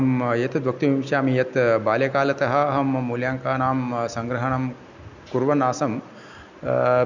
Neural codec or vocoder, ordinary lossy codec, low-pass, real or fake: none; none; 7.2 kHz; real